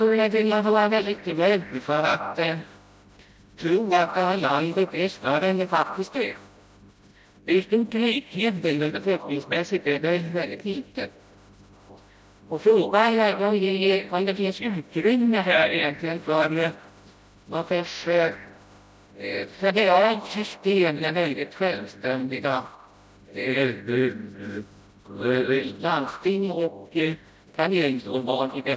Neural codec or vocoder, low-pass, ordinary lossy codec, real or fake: codec, 16 kHz, 0.5 kbps, FreqCodec, smaller model; none; none; fake